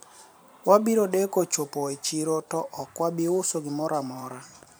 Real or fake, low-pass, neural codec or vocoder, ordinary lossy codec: fake; none; vocoder, 44.1 kHz, 128 mel bands every 512 samples, BigVGAN v2; none